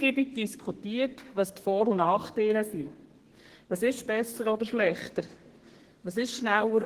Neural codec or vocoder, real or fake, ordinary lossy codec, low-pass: codec, 32 kHz, 1.9 kbps, SNAC; fake; Opus, 16 kbps; 14.4 kHz